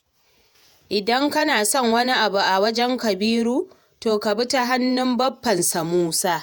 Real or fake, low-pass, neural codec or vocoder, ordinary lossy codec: fake; none; vocoder, 48 kHz, 128 mel bands, Vocos; none